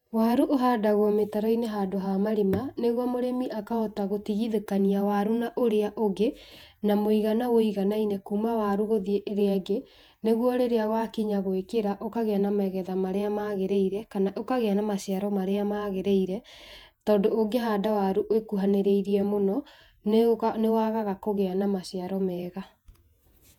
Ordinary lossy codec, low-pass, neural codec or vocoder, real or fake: none; 19.8 kHz; vocoder, 48 kHz, 128 mel bands, Vocos; fake